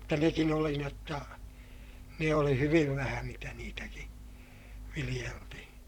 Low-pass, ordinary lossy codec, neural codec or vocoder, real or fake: 19.8 kHz; none; vocoder, 44.1 kHz, 128 mel bands, Pupu-Vocoder; fake